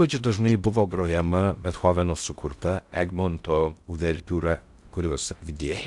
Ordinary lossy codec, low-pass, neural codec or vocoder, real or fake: Opus, 64 kbps; 10.8 kHz; codec, 16 kHz in and 24 kHz out, 0.6 kbps, FocalCodec, streaming, 2048 codes; fake